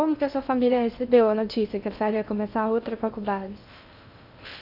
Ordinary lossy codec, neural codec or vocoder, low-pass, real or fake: none; codec, 16 kHz in and 24 kHz out, 0.6 kbps, FocalCodec, streaming, 2048 codes; 5.4 kHz; fake